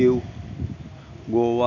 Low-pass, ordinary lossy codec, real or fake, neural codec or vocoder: 7.2 kHz; none; real; none